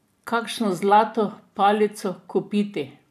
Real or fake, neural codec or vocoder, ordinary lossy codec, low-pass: fake; vocoder, 44.1 kHz, 128 mel bands every 512 samples, BigVGAN v2; none; 14.4 kHz